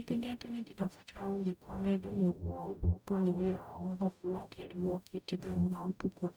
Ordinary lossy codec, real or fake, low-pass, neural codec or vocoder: none; fake; none; codec, 44.1 kHz, 0.9 kbps, DAC